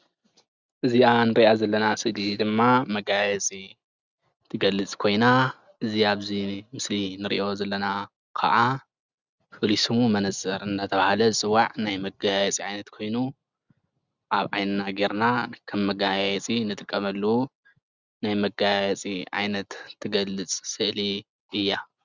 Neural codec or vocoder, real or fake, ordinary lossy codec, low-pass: none; real; Opus, 64 kbps; 7.2 kHz